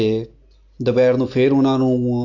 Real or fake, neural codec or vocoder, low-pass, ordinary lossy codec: real; none; 7.2 kHz; AAC, 48 kbps